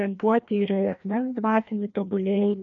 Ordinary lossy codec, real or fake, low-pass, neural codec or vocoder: MP3, 64 kbps; fake; 7.2 kHz; codec, 16 kHz, 1 kbps, FreqCodec, larger model